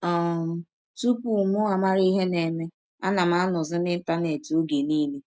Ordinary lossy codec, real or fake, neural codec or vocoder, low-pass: none; real; none; none